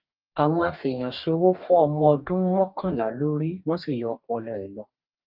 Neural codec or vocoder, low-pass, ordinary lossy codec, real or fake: codec, 44.1 kHz, 2.6 kbps, DAC; 5.4 kHz; Opus, 24 kbps; fake